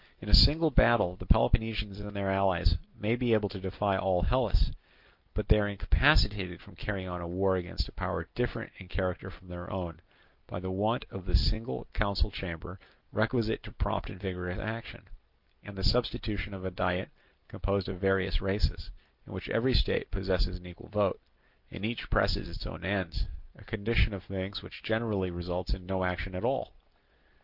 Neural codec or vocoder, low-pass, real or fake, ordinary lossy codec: none; 5.4 kHz; real; Opus, 24 kbps